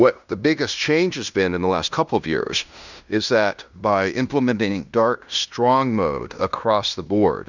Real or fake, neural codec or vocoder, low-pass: fake; codec, 16 kHz in and 24 kHz out, 0.9 kbps, LongCat-Audio-Codec, fine tuned four codebook decoder; 7.2 kHz